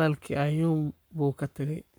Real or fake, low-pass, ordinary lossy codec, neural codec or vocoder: fake; none; none; codec, 44.1 kHz, 7.8 kbps, Pupu-Codec